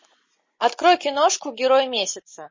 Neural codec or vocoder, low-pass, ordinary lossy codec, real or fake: none; 7.2 kHz; MP3, 32 kbps; real